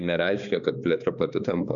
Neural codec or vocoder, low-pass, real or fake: codec, 16 kHz, 4 kbps, X-Codec, HuBERT features, trained on balanced general audio; 7.2 kHz; fake